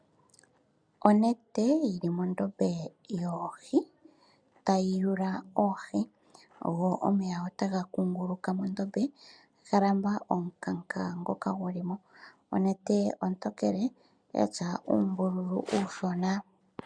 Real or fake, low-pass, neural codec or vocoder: real; 9.9 kHz; none